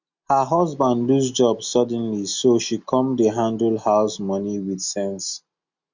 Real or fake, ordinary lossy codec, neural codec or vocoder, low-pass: real; Opus, 64 kbps; none; 7.2 kHz